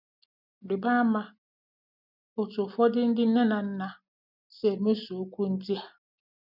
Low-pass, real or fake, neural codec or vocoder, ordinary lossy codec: 5.4 kHz; real; none; none